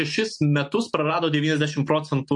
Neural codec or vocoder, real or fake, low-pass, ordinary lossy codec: none; real; 10.8 kHz; MP3, 48 kbps